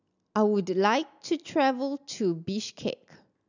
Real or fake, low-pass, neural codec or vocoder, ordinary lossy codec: real; 7.2 kHz; none; none